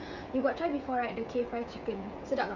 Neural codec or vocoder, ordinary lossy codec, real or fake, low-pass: codec, 16 kHz, 16 kbps, FreqCodec, smaller model; none; fake; 7.2 kHz